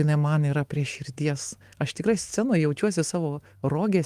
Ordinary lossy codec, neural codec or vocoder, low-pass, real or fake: Opus, 24 kbps; autoencoder, 48 kHz, 128 numbers a frame, DAC-VAE, trained on Japanese speech; 14.4 kHz; fake